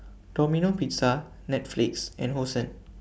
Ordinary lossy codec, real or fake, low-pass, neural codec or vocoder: none; real; none; none